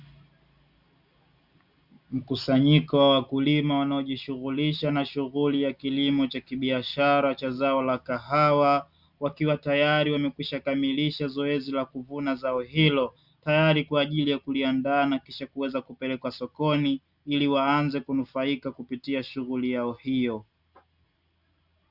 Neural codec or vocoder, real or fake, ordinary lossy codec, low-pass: none; real; Opus, 64 kbps; 5.4 kHz